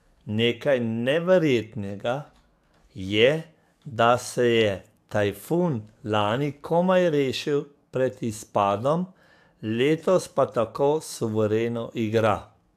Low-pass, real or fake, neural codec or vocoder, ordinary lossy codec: 14.4 kHz; fake; codec, 44.1 kHz, 7.8 kbps, DAC; AAC, 96 kbps